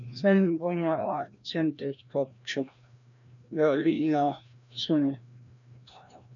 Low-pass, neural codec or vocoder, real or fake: 7.2 kHz; codec, 16 kHz, 1 kbps, FreqCodec, larger model; fake